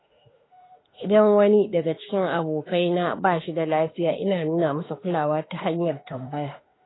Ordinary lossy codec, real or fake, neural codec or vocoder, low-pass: AAC, 16 kbps; fake; autoencoder, 48 kHz, 32 numbers a frame, DAC-VAE, trained on Japanese speech; 7.2 kHz